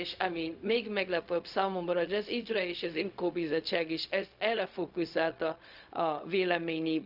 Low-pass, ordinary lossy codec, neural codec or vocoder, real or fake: 5.4 kHz; none; codec, 16 kHz, 0.4 kbps, LongCat-Audio-Codec; fake